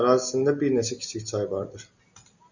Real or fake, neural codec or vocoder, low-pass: real; none; 7.2 kHz